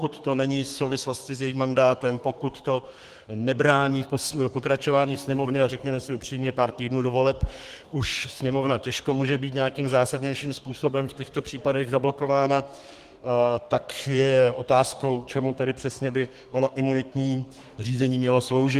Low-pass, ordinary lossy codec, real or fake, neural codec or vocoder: 14.4 kHz; Opus, 24 kbps; fake; codec, 32 kHz, 1.9 kbps, SNAC